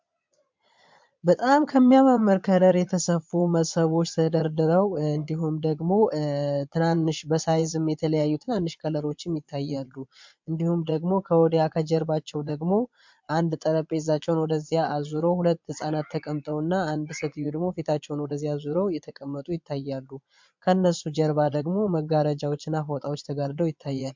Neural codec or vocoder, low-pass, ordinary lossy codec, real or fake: vocoder, 22.05 kHz, 80 mel bands, Vocos; 7.2 kHz; MP3, 64 kbps; fake